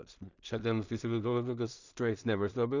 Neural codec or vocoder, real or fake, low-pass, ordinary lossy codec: codec, 16 kHz in and 24 kHz out, 0.4 kbps, LongCat-Audio-Codec, two codebook decoder; fake; 7.2 kHz; Opus, 64 kbps